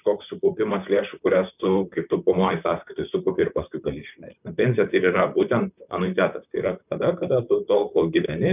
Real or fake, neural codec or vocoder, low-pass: fake; vocoder, 44.1 kHz, 128 mel bands, Pupu-Vocoder; 3.6 kHz